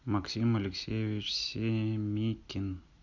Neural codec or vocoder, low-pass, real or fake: none; 7.2 kHz; real